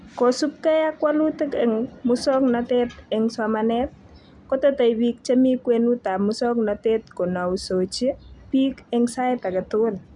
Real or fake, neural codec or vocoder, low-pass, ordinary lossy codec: real; none; 10.8 kHz; none